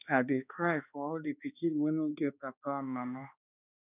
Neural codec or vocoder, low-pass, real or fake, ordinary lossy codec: codec, 16 kHz, 2 kbps, X-Codec, HuBERT features, trained on balanced general audio; 3.6 kHz; fake; none